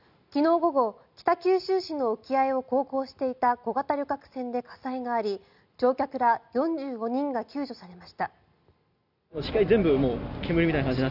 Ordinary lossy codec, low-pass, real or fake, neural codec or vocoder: none; 5.4 kHz; real; none